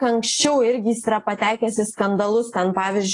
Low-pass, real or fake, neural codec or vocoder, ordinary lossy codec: 10.8 kHz; real; none; AAC, 32 kbps